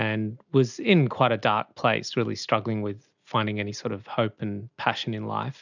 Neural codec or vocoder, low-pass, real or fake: none; 7.2 kHz; real